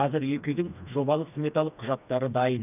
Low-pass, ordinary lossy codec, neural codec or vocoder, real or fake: 3.6 kHz; none; codec, 16 kHz, 2 kbps, FreqCodec, smaller model; fake